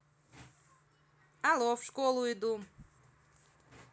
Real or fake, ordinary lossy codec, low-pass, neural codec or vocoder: real; none; none; none